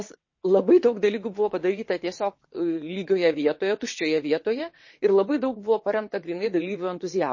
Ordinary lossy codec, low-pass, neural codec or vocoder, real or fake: MP3, 32 kbps; 7.2 kHz; vocoder, 22.05 kHz, 80 mel bands, WaveNeXt; fake